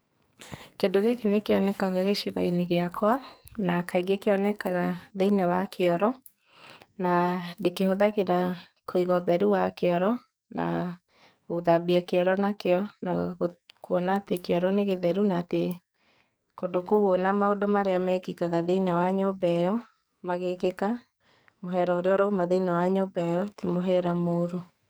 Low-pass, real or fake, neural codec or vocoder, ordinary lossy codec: none; fake; codec, 44.1 kHz, 2.6 kbps, SNAC; none